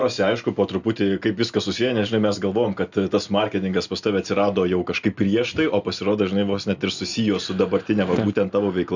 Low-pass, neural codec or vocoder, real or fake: 7.2 kHz; none; real